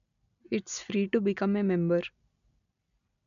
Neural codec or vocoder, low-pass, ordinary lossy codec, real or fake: none; 7.2 kHz; none; real